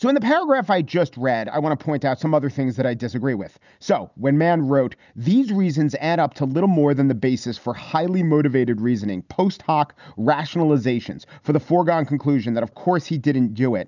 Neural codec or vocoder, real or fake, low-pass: none; real; 7.2 kHz